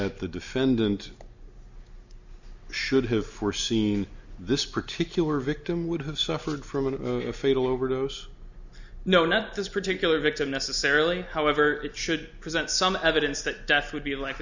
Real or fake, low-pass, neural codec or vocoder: real; 7.2 kHz; none